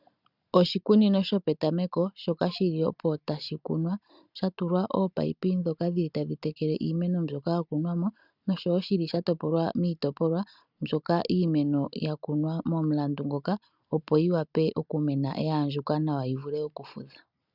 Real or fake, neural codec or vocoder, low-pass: real; none; 5.4 kHz